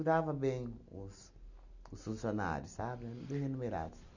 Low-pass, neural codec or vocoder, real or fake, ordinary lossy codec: 7.2 kHz; none; real; none